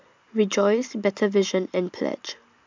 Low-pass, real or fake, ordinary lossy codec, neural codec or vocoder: 7.2 kHz; real; MP3, 64 kbps; none